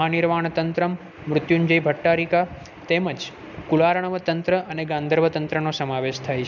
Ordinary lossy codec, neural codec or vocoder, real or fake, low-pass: none; none; real; 7.2 kHz